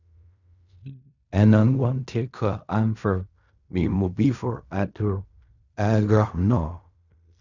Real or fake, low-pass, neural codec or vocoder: fake; 7.2 kHz; codec, 16 kHz in and 24 kHz out, 0.4 kbps, LongCat-Audio-Codec, fine tuned four codebook decoder